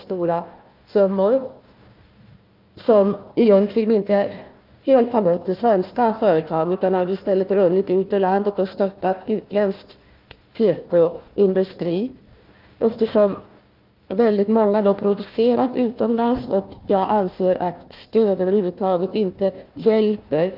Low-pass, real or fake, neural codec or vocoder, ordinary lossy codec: 5.4 kHz; fake; codec, 16 kHz, 1 kbps, FunCodec, trained on Chinese and English, 50 frames a second; Opus, 24 kbps